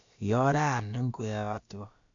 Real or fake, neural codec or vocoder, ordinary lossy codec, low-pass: fake; codec, 16 kHz, about 1 kbps, DyCAST, with the encoder's durations; MP3, 64 kbps; 7.2 kHz